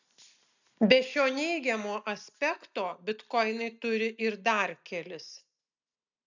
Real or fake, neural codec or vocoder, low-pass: real; none; 7.2 kHz